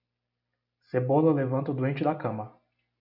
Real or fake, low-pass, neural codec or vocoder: real; 5.4 kHz; none